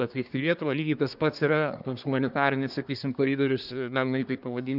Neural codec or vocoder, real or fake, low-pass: codec, 24 kHz, 1 kbps, SNAC; fake; 5.4 kHz